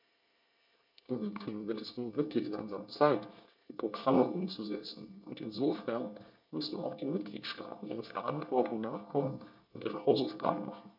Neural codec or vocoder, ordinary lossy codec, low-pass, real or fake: codec, 24 kHz, 1 kbps, SNAC; MP3, 48 kbps; 5.4 kHz; fake